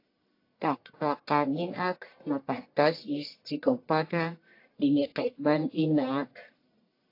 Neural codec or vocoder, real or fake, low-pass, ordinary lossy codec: codec, 44.1 kHz, 1.7 kbps, Pupu-Codec; fake; 5.4 kHz; AAC, 32 kbps